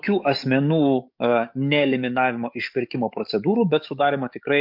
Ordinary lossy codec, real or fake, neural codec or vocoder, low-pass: MP3, 48 kbps; fake; codec, 44.1 kHz, 7.8 kbps, DAC; 5.4 kHz